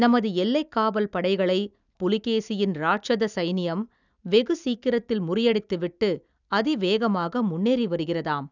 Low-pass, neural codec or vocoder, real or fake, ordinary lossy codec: 7.2 kHz; none; real; none